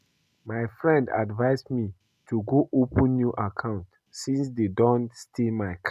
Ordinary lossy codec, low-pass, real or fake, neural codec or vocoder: none; 14.4 kHz; real; none